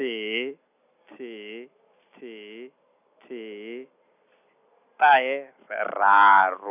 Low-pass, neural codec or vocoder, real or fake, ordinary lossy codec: 3.6 kHz; none; real; none